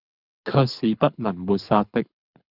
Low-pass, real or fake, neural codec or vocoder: 5.4 kHz; fake; codec, 24 kHz, 6 kbps, HILCodec